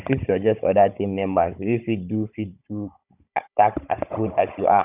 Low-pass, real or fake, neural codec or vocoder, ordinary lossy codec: 3.6 kHz; fake; codec, 16 kHz, 16 kbps, FunCodec, trained on Chinese and English, 50 frames a second; none